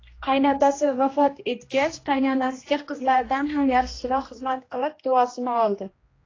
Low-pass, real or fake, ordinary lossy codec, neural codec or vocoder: 7.2 kHz; fake; AAC, 32 kbps; codec, 16 kHz, 1 kbps, X-Codec, HuBERT features, trained on general audio